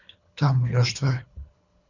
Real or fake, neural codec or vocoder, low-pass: fake; codec, 24 kHz, 3 kbps, HILCodec; 7.2 kHz